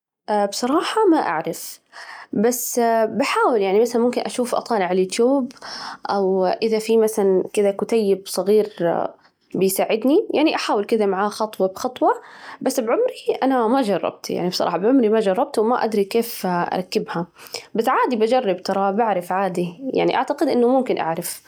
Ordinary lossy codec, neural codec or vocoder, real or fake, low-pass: none; none; real; 19.8 kHz